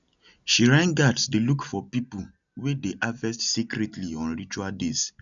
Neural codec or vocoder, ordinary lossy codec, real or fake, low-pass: none; none; real; 7.2 kHz